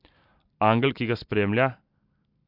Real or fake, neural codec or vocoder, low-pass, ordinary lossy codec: real; none; 5.4 kHz; MP3, 48 kbps